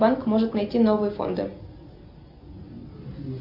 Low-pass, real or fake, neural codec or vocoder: 5.4 kHz; real; none